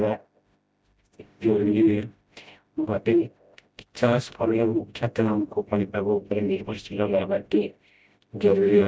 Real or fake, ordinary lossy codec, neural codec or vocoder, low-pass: fake; none; codec, 16 kHz, 0.5 kbps, FreqCodec, smaller model; none